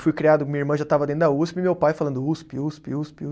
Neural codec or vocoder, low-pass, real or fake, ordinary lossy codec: none; none; real; none